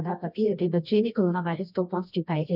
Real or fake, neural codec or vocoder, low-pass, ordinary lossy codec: fake; codec, 24 kHz, 0.9 kbps, WavTokenizer, medium music audio release; 5.4 kHz; none